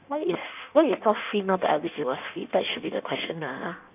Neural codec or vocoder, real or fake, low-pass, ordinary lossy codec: codec, 16 kHz in and 24 kHz out, 1.1 kbps, FireRedTTS-2 codec; fake; 3.6 kHz; none